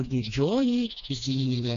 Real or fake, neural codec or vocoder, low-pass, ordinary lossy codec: fake; codec, 16 kHz, 2 kbps, FreqCodec, smaller model; 7.2 kHz; MP3, 96 kbps